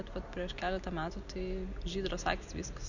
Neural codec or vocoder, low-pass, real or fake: none; 7.2 kHz; real